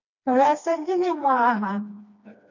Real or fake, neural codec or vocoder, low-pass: fake; codec, 16 kHz, 2 kbps, FreqCodec, smaller model; 7.2 kHz